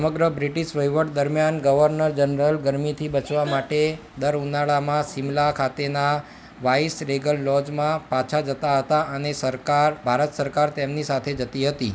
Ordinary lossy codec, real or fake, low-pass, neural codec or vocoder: none; real; none; none